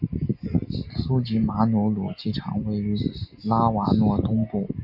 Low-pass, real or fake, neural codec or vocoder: 5.4 kHz; real; none